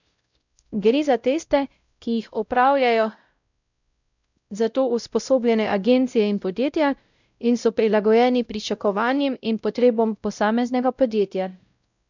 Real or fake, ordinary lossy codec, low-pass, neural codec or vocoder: fake; none; 7.2 kHz; codec, 16 kHz, 0.5 kbps, X-Codec, WavLM features, trained on Multilingual LibriSpeech